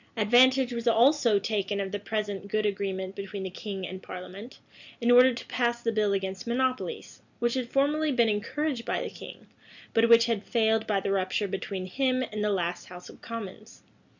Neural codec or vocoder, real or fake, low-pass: none; real; 7.2 kHz